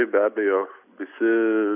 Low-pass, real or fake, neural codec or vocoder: 3.6 kHz; real; none